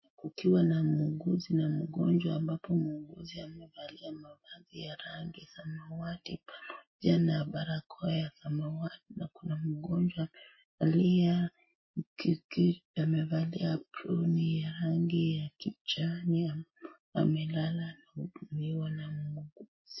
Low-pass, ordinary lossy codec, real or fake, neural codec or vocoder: 7.2 kHz; MP3, 24 kbps; real; none